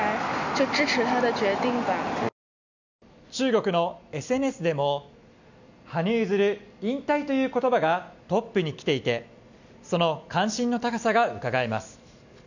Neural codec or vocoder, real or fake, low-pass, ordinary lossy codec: none; real; 7.2 kHz; none